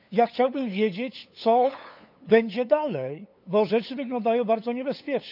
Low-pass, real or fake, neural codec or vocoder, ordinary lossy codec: 5.4 kHz; fake; codec, 16 kHz, 8 kbps, FunCodec, trained on LibriTTS, 25 frames a second; none